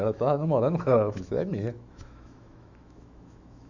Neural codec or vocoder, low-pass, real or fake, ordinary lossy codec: autoencoder, 48 kHz, 128 numbers a frame, DAC-VAE, trained on Japanese speech; 7.2 kHz; fake; none